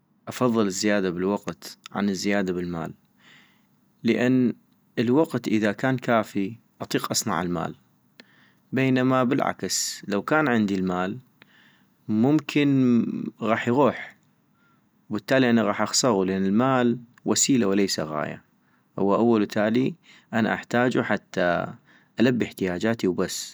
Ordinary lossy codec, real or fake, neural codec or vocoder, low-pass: none; real; none; none